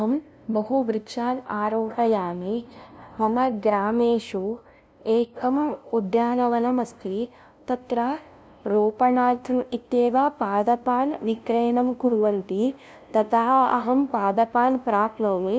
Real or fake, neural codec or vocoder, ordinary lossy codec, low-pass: fake; codec, 16 kHz, 0.5 kbps, FunCodec, trained on LibriTTS, 25 frames a second; none; none